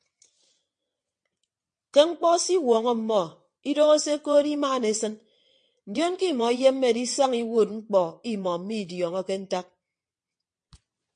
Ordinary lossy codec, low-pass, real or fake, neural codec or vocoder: MP3, 48 kbps; 9.9 kHz; fake; vocoder, 22.05 kHz, 80 mel bands, WaveNeXt